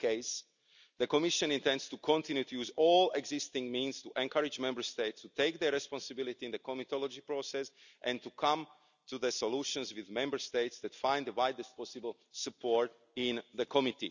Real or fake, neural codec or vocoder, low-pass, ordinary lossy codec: real; none; 7.2 kHz; none